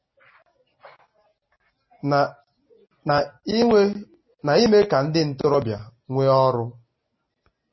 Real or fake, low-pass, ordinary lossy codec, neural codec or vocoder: real; 7.2 kHz; MP3, 24 kbps; none